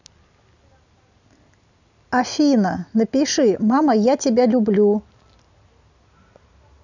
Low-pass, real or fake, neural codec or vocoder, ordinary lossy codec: 7.2 kHz; real; none; none